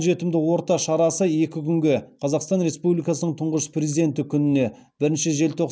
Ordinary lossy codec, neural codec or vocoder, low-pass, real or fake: none; none; none; real